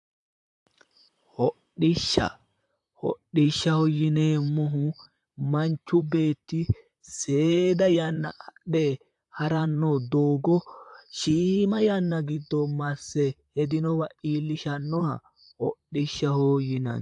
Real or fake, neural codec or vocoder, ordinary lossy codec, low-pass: fake; vocoder, 44.1 kHz, 128 mel bands, Pupu-Vocoder; AAC, 64 kbps; 10.8 kHz